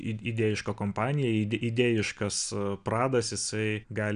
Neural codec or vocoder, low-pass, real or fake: none; 9.9 kHz; real